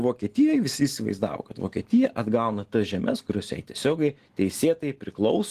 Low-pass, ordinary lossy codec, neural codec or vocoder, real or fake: 14.4 kHz; Opus, 16 kbps; none; real